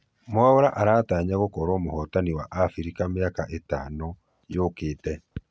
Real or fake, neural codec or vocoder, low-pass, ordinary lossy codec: real; none; none; none